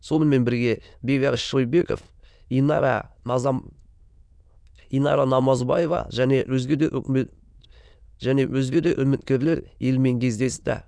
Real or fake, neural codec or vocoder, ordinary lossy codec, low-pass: fake; autoencoder, 22.05 kHz, a latent of 192 numbers a frame, VITS, trained on many speakers; none; 9.9 kHz